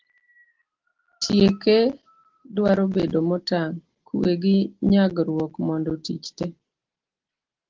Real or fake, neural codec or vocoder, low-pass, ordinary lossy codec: real; none; 7.2 kHz; Opus, 16 kbps